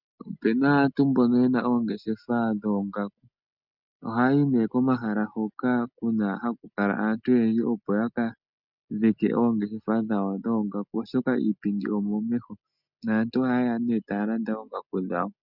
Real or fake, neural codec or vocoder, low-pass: real; none; 5.4 kHz